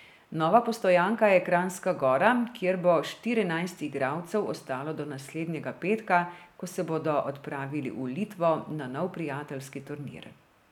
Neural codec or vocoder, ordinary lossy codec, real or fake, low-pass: none; none; real; 19.8 kHz